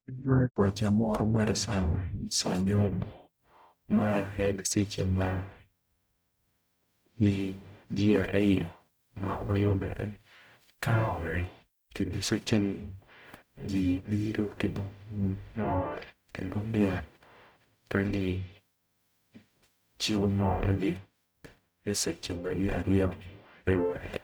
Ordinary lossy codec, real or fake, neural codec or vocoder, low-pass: none; fake; codec, 44.1 kHz, 0.9 kbps, DAC; none